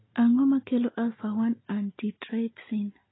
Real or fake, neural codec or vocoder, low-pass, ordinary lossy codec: real; none; 7.2 kHz; AAC, 16 kbps